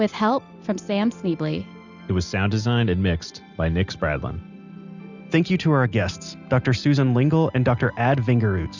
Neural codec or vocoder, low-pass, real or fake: none; 7.2 kHz; real